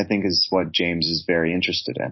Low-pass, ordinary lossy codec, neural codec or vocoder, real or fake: 7.2 kHz; MP3, 24 kbps; none; real